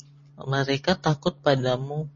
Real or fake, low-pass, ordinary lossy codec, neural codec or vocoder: real; 7.2 kHz; MP3, 32 kbps; none